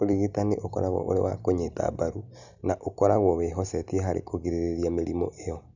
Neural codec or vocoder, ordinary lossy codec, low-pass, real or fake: none; none; 7.2 kHz; real